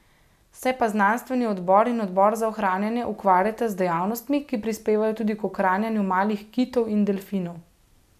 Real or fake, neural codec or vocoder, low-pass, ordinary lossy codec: real; none; 14.4 kHz; none